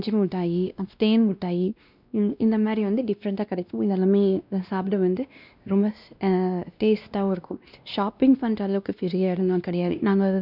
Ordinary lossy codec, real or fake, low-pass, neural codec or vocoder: none; fake; 5.4 kHz; codec, 16 kHz, 1 kbps, X-Codec, WavLM features, trained on Multilingual LibriSpeech